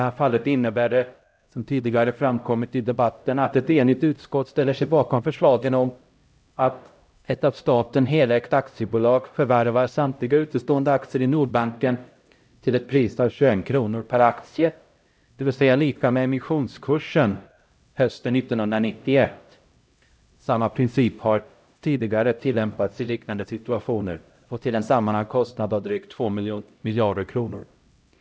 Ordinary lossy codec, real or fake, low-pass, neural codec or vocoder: none; fake; none; codec, 16 kHz, 0.5 kbps, X-Codec, HuBERT features, trained on LibriSpeech